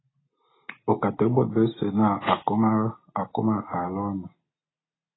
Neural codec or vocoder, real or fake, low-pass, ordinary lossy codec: none; real; 7.2 kHz; AAC, 16 kbps